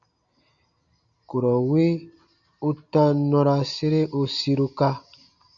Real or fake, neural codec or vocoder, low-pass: real; none; 7.2 kHz